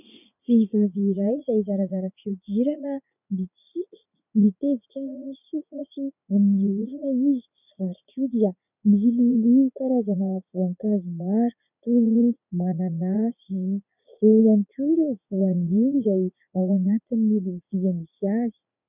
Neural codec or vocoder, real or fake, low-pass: vocoder, 44.1 kHz, 80 mel bands, Vocos; fake; 3.6 kHz